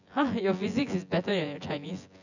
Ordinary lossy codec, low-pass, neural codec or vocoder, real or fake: none; 7.2 kHz; vocoder, 24 kHz, 100 mel bands, Vocos; fake